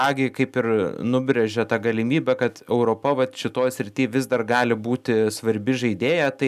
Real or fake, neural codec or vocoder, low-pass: real; none; 14.4 kHz